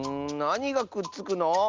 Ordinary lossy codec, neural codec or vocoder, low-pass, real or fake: Opus, 32 kbps; none; 7.2 kHz; real